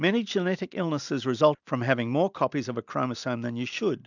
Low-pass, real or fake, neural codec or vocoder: 7.2 kHz; real; none